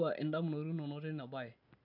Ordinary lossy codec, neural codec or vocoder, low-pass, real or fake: none; none; 5.4 kHz; real